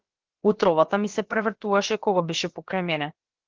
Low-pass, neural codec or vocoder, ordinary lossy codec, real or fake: 7.2 kHz; codec, 16 kHz, about 1 kbps, DyCAST, with the encoder's durations; Opus, 16 kbps; fake